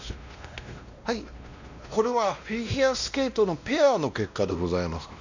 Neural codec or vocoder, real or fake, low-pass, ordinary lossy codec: codec, 16 kHz, 1 kbps, X-Codec, WavLM features, trained on Multilingual LibriSpeech; fake; 7.2 kHz; none